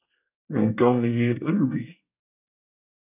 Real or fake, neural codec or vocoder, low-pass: fake; codec, 24 kHz, 1 kbps, SNAC; 3.6 kHz